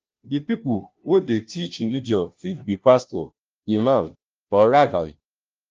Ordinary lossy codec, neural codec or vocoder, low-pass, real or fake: Opus, 24 kbps; codec, 16 kHz, 0.5 kbps, FunCodec, trained on Chinese and English, 25 frames a second; 7.2 kHz; fake